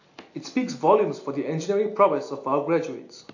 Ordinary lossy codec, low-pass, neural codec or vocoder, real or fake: AAC, 48 kbps; 7.2 kHz; none; real